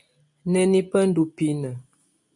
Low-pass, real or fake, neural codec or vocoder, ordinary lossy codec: 10.8 kHz; real; none; MP3, 64 kbps